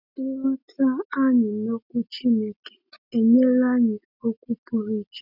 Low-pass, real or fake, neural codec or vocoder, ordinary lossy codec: 5.4 kHz; real; none; none